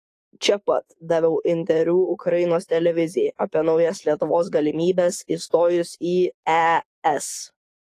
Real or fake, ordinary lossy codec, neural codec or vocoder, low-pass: fake; AAC, 48 kbps; codec, 44.1 kHz, 7.8 kbps, DAC; 14.4 kHz